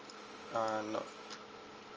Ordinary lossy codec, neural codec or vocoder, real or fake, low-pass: Opus, 24 kbps; none; real; 7.2 kHz